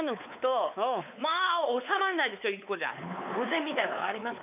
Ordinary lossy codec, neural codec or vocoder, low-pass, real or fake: none; codec, 16 kHz, 4 kbps, X-Codec, WavLM features, trained on Multilingual LibriSpeech; 3.6 kHz; fake